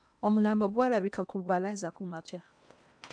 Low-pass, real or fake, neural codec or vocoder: 9.9 kHz; fake; codec, 16 kHz in and 24 kHz out, 0.8 kbps, FocalCodec, streaming, 65536 codes